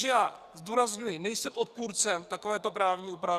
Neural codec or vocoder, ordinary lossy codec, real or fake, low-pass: codec, 44.1 kHz, 2.6 kbps, SNAC; Opus, 64 kbps; fake; 14.4 kHz